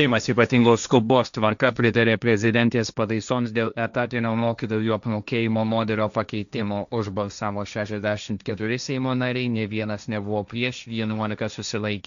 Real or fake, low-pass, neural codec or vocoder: fake; 7.2 kHz; codec, 16 kHz, 1.1 kbps, Voila-Tokenizer